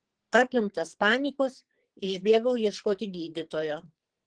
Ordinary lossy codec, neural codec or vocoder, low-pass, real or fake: Opus, 16 kbps; codec, 44.1 kHz, 2.6 kbps, SNAC; 9.9 kHz; fake